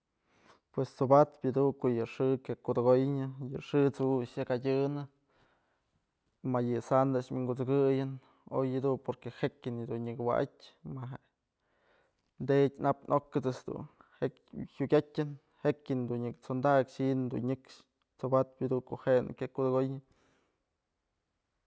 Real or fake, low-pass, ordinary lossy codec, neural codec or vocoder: real; none; none; none